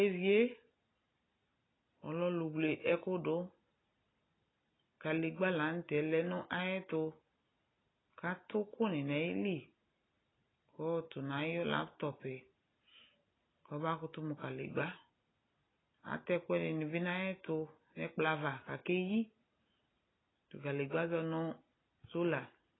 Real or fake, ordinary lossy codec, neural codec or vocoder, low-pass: real; AAC, 16 kbps; none; 7.2 kHz